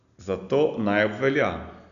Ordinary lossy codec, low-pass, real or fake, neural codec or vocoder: none; 7.2 kHz; real; none